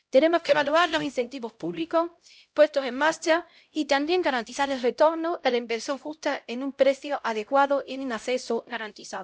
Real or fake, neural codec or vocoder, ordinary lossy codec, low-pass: fake; codec, 16 kHz, 0.5 kbps, X-Codec, HuBERT features, trained on LibriSpeech; none; none